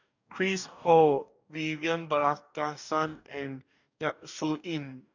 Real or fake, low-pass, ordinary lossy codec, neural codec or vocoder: fake; 7.2 kHz; none; codec, 44.1 kHz, 2.6 kbps, DAC